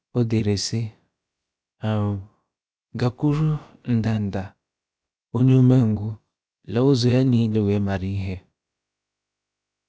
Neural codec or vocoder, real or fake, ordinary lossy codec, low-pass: codec, 16 kHz, about 1 kbps, DyCAST, with the encoder's durations; fake; none; none